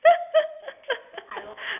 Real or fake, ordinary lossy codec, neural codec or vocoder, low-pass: real; none; none; 3.6 kHz